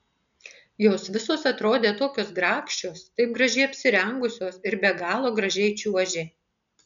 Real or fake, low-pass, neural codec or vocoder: real; 7.2 kHz; none